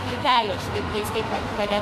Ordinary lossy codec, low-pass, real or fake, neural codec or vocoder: AAC, 96 kbps; 14.4 kHz; fake; autoencoder, 48 kHz, 32 numbers a frame, DAC-VAE, trained on Japanese speech